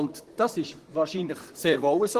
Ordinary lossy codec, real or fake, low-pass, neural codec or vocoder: Opus, 16 kbps; fake; 14.4 kHz; vocoder, 44.1 kHz, 128 mel bands, Pupu-Vocoder